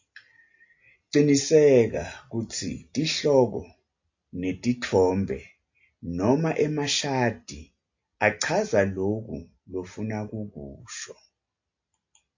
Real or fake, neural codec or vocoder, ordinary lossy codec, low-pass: real; none; AAC, 48 kbps; 7.2 kHz